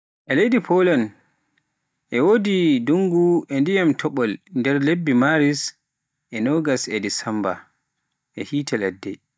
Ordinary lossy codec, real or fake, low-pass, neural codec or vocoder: none; real; none; none